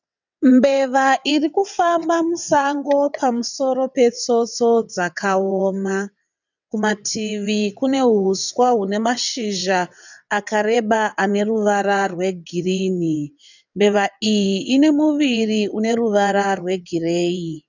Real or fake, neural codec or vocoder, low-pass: fake; vocoder, 22.05 kHz, 80 mel bands, WaveNeXt; 7.2 kHz